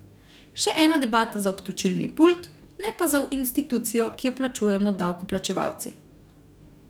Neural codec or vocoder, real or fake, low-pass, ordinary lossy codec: codec, 44.1 kHz, 2.6 kbps, DAC; fake; none; none